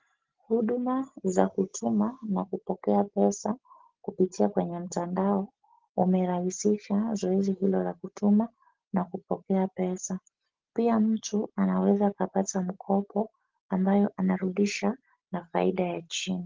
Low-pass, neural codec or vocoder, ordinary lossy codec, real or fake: 7.2 kHz; none; Opus, 16 kbps; real